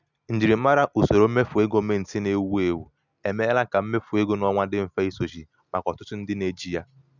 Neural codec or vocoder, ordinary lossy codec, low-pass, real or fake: none; none; 7.2 kHz; real